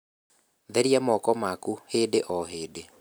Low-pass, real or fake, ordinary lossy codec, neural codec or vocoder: none; real; none; none